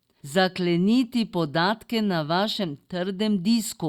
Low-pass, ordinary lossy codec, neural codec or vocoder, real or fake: 19.8 kHz; Opus, 64 kbps; none; real